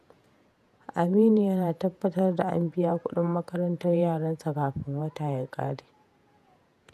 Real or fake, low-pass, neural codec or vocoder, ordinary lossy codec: fake; 14.4 kHz; vocoder, 48 kHz, 128 mel bands, Vocos; none